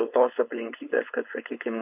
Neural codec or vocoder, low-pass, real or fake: codec, 16 kHz, 4.8 kbps, FACodec; 3.6 kHz; fake